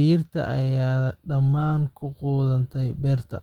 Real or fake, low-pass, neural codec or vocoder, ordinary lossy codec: fake; 19.8 kHz; autoencoder, 48 kHz, 128 numbers a frame, DAC-VAE, trained on Japanese speech; Opus, 16 kbps